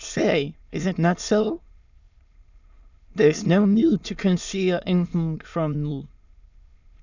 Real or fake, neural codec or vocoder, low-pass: fake; autoencoder, 22.05 kHz, a latent of 192 numbers a frame, VITS, trained on many speakers; 7.2 kHz